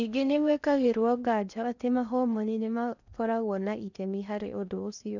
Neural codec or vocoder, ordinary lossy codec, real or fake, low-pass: codec, 16 kHz in and 24 kHz out, 0.6 kbps, FocalCodec, streaming, 4096 codes; none; fake; 7.2 kHz